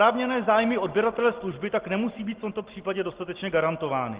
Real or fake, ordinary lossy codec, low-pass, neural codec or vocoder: real; Opus, 16 kbps; 3.6 kHz; none